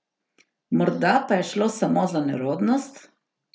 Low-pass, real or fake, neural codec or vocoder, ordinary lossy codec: none; real; none; none